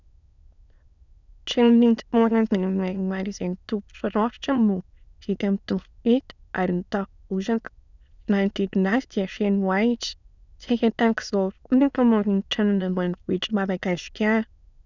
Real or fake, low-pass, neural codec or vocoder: fake; 7.2 kHz; autoencoder, 22.05 kHz, a latent of 192 numbers a frame, VITS, trained on many speakers